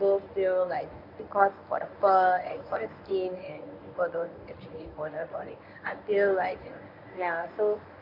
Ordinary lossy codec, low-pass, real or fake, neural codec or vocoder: none; 5.4 kHz; fake; codec, 24 kHz, 0.9 kbps, WavTokenizer, medium speech release version 1